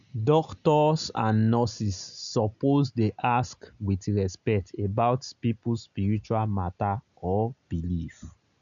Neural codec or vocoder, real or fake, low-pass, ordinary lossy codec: none; real; 7.2 kHz; none